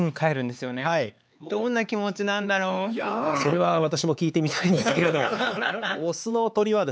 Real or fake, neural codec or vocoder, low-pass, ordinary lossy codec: fake; codec, 16 kHz, 4 kbps, X-Codec, HuBERT features, trained on LibriSpeech; none; none